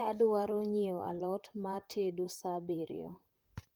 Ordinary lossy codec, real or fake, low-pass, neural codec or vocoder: Opus, 32 kbps; fake; 19.8 kHz; vocoder, 44.1 kHz, 128 mel bands, Pupu-Vocoder